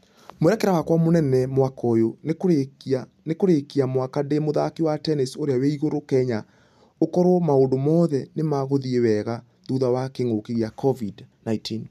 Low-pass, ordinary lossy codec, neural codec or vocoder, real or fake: 14.4 kHz; none; none; real